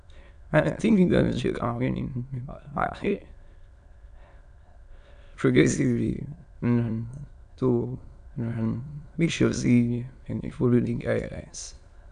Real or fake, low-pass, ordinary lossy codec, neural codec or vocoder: fake; 9.9 kHz; none; autoencoder, 22.05 kHz, a latent of 192 numbers a frame, VITS, trained on many speakers